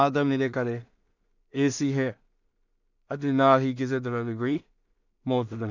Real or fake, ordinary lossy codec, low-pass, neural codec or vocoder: fake; none; 7.2 kHz; codec, 16 kHz in and 24 kHz out, 0.4 kbps, LongCat-Audio-Codec, two codebook decoder